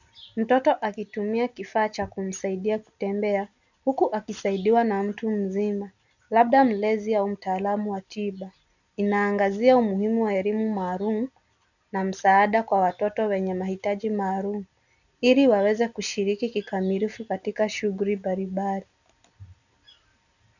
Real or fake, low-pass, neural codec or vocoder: real; 7.2 kHz; none